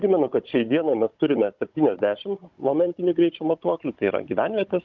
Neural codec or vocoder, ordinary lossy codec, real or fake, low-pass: codec, 16 kHz, 16 kbps, FunCodec, trained on Chinese and English, 50 frames a second; Opus, 24 kbps; fake; 7.2 kHz